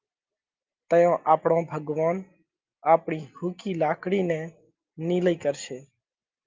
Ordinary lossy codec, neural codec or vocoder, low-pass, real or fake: Opus, 24 kbps; none; 7.2 kHz; real